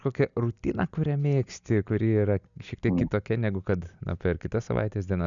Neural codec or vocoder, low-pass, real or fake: none; 7.2 kHz; real